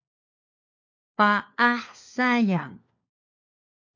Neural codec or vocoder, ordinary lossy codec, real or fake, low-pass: codec, 16 kHz, 4 kbps, FunCodec, trained on LibriTTS, 50 frames a second; MP3, 48 kbps; fake; 7.2 kHz